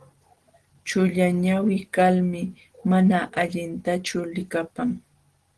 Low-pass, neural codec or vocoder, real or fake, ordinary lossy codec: 10.8 kHz; none; real; Opus, 16 kbps